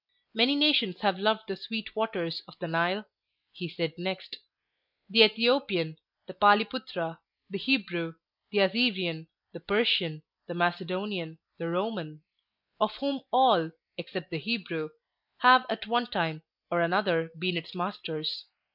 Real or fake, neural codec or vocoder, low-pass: real; none; 5.4 kHz